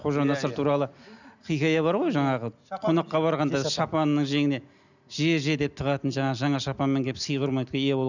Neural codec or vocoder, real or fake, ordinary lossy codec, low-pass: none; real; none; 7.2 kHz